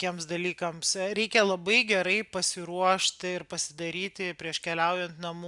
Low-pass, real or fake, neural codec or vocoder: 10.8 kHz; real; none